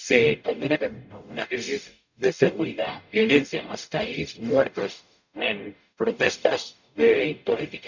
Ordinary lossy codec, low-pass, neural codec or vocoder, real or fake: none; 7.2 kHz; codec, 44.1 kHz, 0.9 kbps, DAC; fake